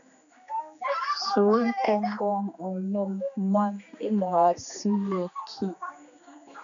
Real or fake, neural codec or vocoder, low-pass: fake; codec, 16 kHz, 2 kbps, X-Codec, HuBERT features, trained on general audio; 7.2 kHz